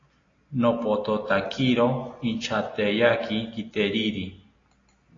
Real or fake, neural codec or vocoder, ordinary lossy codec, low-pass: real; none; AAC, 32 kbps; 7.2 kHz